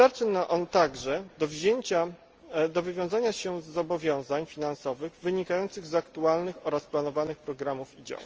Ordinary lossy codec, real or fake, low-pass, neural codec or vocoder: Opus, 24 kbps; real; 7.2 kHz; none